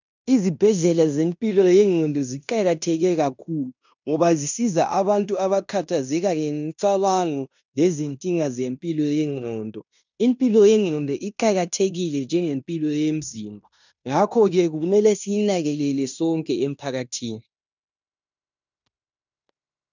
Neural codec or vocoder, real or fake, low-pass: codec, 16 kHz in and 24 kHz out, 0.9 kbps, LongCat-Audio-Codec, fine tuned four codebook decoder; fake; 7.2 kHz